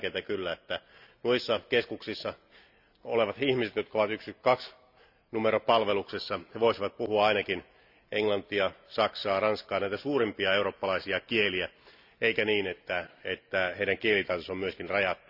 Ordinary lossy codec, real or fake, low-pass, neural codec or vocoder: none; real; 5.4 kHz; none